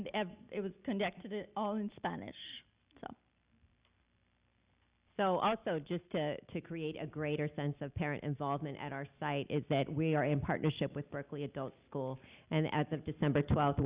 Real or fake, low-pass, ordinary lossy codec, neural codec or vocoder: real; 3.6 kHz; Opus, 64 kbps; none